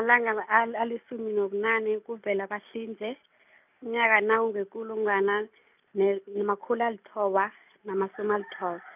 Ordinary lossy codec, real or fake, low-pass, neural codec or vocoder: none; real; 3.6 kHz; none